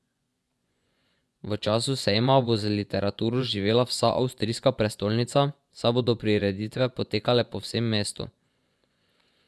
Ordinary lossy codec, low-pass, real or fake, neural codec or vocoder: none; none; fake; vocoder, 24 kHz, 100 mel bands, Vocos